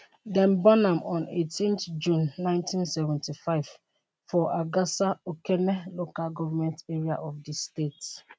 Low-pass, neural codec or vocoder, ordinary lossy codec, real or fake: none; none; none; real